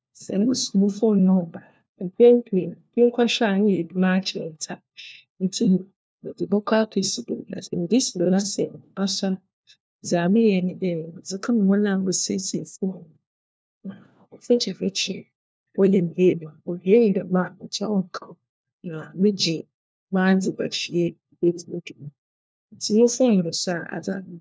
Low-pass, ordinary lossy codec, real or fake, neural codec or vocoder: none; none; fake; codec, 16 kHz, 1 kbps, FunCodec, trained on LibriTTS, 50 frames a second